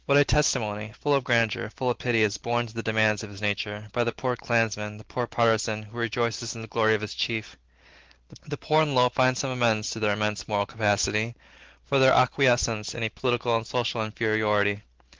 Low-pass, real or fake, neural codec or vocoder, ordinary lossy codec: 7.2 kHz; real; none; Opus, 16 kbps